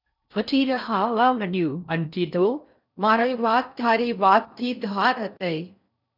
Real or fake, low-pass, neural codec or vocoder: fake; 5.4 kHz; codec, 16 kHz in and 24 kHz out, 0.6 kbps, FocalCodec, streaming, 4096 codes